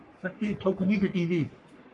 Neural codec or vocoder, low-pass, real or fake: codec, 44.1 kHz, 3.4 kbps, Pupu-Codec; 10.8 kHz; fake